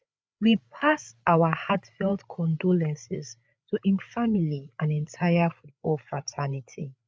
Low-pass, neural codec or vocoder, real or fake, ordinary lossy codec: none; codec, 16 kHz, 8 kbps, FreqCodec, larger model; fake; none